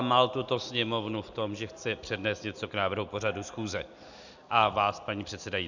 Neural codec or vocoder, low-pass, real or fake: none; 7.2 kHz; real